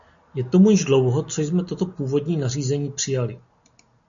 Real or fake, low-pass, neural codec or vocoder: real; 7.2 kHz; none